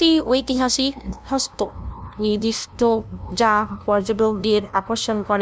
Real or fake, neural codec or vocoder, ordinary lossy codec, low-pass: fake; codec, 16 kHz, 0.5 kbps, FunCodec, trained on LibriTTS, 25 frames a second; none; none